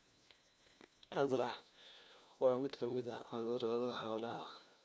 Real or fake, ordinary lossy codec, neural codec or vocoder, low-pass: fake; none; codec, 16 kHz, 1 kbps, FunCodec, trained on LibriTTS, 50 frames a second; none